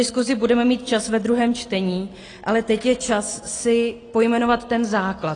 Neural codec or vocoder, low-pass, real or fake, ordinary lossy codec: none; 9.9 kHz; real; AAC, 32 kbps